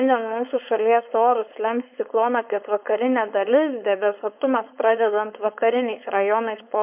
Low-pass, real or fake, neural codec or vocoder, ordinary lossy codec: 3.6 kHz; fake; codec, 16 kHz, 4.8 kbps, FACodec; MP3, 32 kbps